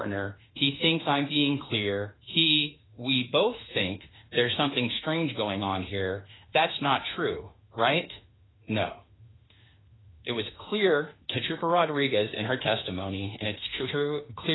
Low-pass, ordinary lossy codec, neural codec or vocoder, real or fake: 7.2 kHz; AAC, 16 kbps; autoencoder, 48 kHz, 32 numbers a frame, DAC-VAE, trained on Japanese speech; fake